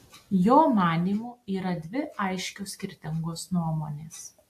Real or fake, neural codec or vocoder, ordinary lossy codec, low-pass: real; none; AAC, 64 kbps; 14.4 kHz